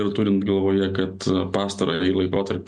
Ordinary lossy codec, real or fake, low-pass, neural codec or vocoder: Opus, 64 kbps; real; 10.8 kHz; none